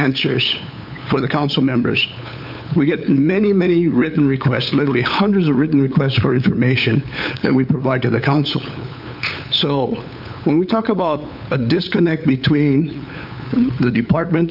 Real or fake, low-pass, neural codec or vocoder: fake; 5.4 kHz; codec, 16 kHz, 8 kbps, FunCodec, trained on LibriTTS, 25 frames a second